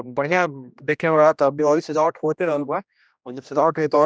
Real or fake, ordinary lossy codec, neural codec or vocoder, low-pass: fake; none; codec, 16 kHz, 1 kbps, X-Codec, HuBERT features, trained on general audio; none